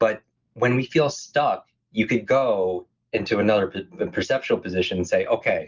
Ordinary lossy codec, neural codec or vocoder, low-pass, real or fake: Opus, 24 kbps; none; 7.2 kHz; real